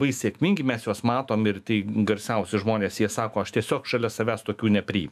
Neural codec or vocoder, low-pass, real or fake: autoencoder, 48 kHz, 128 numbers a frame, DAC-VAE, trained on Japanese speech; 14.4 kHz; fake